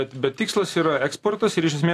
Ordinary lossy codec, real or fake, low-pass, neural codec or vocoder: AAC, 64 kbps; real; 14.4 kHz; none